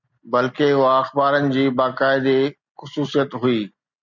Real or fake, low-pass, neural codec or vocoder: real; 7.2 kHz; none